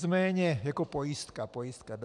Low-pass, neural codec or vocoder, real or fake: 10.8 kHz; none; real